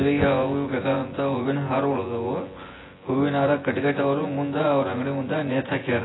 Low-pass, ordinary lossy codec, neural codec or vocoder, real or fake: 7.2 kHz; AAC, 16 kbps; vocoder, 24 kHz, 100 mel bands, Vocos; fake